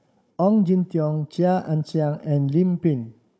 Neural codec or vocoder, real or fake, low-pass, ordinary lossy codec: codec, 16 kHz, 16 kbps, FunCodec, trained on Chinese and English, 50 frames a second; fake; none; none